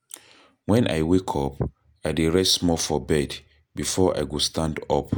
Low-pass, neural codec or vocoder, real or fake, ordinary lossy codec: none; none; real; none